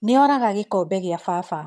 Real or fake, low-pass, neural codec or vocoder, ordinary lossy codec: fake; none; vocoder, 22.05 kHz, 80 mel bands, HiFi-GAN; none